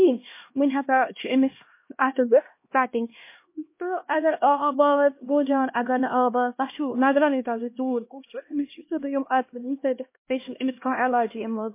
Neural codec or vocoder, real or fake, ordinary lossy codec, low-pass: codec, 16 kHz, 1 kbps, X-Codec, HuBERT features, trained on LibriSpeech; fake; MP3, 24 kbps; 3.6 kHz